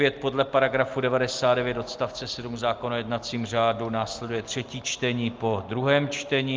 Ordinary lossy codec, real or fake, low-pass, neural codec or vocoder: Opus, 32 kbps; real; 7.2 kHz; none